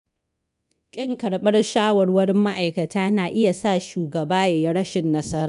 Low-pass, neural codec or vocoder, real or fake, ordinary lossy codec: 10.8 kHz; codec, 24 kHz, 0.9 kbps, DualCodec; fake; none